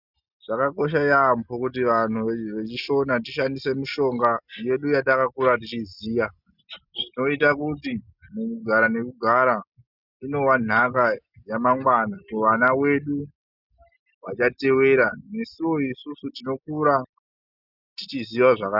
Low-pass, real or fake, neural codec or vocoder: 5.4 kHz; real; none